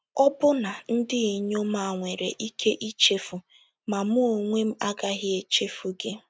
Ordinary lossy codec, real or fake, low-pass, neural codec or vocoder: none; real; none; none